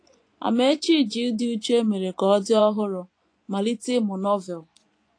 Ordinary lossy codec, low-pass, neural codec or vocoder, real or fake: AAC, 48 kbps; 9.9 kHz; vocoder, 24 kHz, 100 mel bands, Vocos; fake